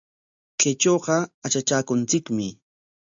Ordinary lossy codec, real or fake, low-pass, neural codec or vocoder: AAC, 64 kbps; real; 7.2 kHz; none